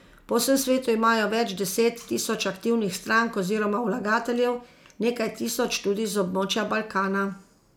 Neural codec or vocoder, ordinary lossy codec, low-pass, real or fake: none; none; none; real